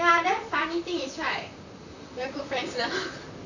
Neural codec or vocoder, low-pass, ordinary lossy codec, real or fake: vocoder, 44.1 kHz, 128 mel bands, Pupu-Vocoder; 7.2 kHz; none; fake